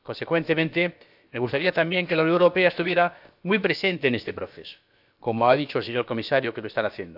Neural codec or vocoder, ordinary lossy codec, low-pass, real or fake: codec, 16 kHz, about 1 kbps, DyCAST, with the encoder's durations; AAC, 48 kbps; 5.4 kHz; fake